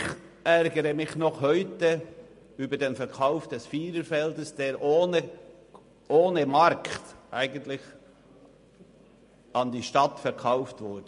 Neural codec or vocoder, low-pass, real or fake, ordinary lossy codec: none; 10.8 kHz; real; MP3, 48 kbps